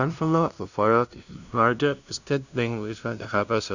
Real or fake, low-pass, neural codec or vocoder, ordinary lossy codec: fake; 7.2 kHz; codec, 16 kHz, 0.5 kbps, FunCodec, trained on LibriTTS, 25 frames a second; none